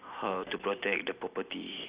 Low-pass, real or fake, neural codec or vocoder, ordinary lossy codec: 3.6 kHz; real; none; Opus, 64 kbps